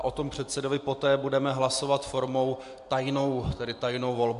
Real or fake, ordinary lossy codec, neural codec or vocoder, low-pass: real; MP3, 64 kbps; none; 10.8 kHz